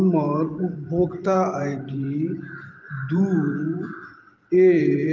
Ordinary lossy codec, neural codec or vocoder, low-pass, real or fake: Opus, 32 kbps; vocoder, 44.1 kHz, 128 mel bands every 512 samples, BigVGAN v2; 7.2 kHz; fake